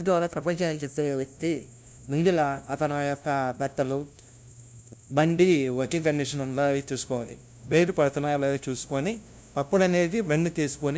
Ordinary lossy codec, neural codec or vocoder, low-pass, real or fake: none; codec, 16 kHz, 0.5 kbps, FunCodec, trained on LibriTTS, 25 frames a second; none; fake